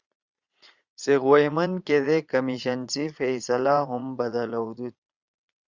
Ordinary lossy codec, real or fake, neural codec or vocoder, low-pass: Opus, 64 kbps; fake; vocoder, 22.05 kHz, 80 mel bands, Vocos; 7.2 kHz